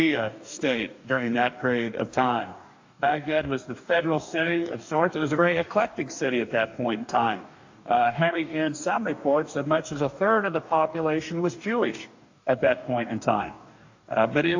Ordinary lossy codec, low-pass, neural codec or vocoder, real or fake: AAC, 48 kbps; 7.2 kHz; codec, 44.1 kHz, 2.6 kbps, DAC; fake